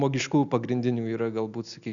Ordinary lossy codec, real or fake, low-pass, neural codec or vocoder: Opus, 64 kbps; real; 7.2 kHz; none